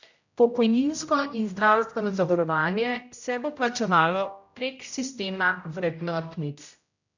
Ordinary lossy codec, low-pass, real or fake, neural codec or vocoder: none; 7.2 kHz; fake; codec, 16 kHz, 0.5 kbps, X-Codec, HuBERT features, trained on general audio